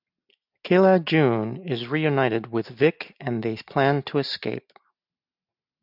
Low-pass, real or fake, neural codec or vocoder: 5.4 kHz; real; none